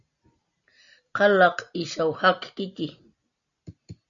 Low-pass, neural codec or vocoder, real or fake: 7.2 kHz; none; real